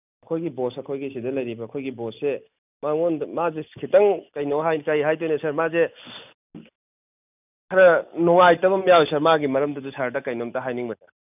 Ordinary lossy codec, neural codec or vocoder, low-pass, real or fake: none; none; 3.6 kHz; real